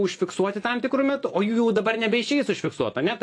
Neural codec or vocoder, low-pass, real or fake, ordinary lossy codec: vocoder, 24 kHz, 100 mel bands, Vocos; 9.9 kHz; fake; AAC, 48 kbps